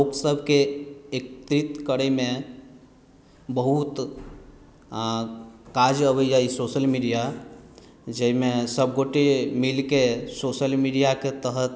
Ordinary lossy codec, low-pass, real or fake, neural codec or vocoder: none; none; real; none